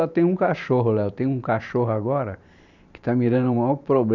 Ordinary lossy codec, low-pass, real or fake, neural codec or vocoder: none; 7.2 kHz; fake; vocoder, 44.1 kHz, 128 mel bands every 512 samples, BigVGAN v2